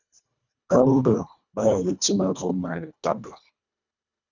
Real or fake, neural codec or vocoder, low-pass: fake; codec, 24 kHz, 1.5 kbps, HILCodec; 7.2 kHz